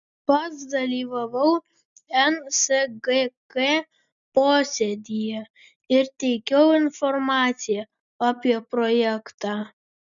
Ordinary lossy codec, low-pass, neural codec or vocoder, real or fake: MP3, 96 kbps; 7.2 kHz; none; real